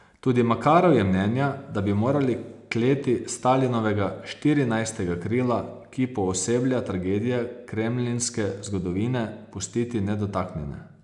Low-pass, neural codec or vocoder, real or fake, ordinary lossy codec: 10.8 kHz; none; real; none